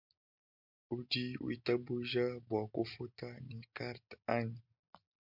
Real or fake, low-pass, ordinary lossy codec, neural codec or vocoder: real; 5.4 kHz; MP3, 48 kbps; none